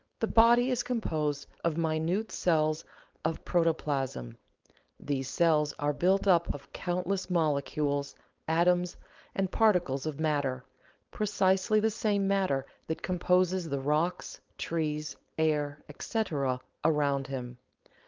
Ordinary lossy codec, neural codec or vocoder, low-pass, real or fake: Opus, 32 kbps; codec, 16 kHz, 4.8 kbps, FACodec; 7.2 kHz; fake